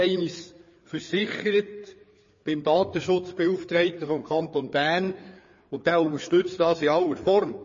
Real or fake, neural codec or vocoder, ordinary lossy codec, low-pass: fake; codec, 16 kHz, 4 kbps, FreqCodec, larger model; MP3, 32 kbps; 7.2 kHz